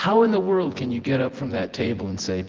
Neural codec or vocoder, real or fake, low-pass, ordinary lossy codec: vocoder, 24 kHz, 100 mel bands, Vocos; fake; 7.2 kHz; Opus, 16 kbps